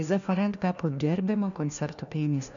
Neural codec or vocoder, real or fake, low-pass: codec, 16 kHz, 1 kbps, FunCodec, trained on LibriTTS, 50 frames a second; fake; 7.2 kHz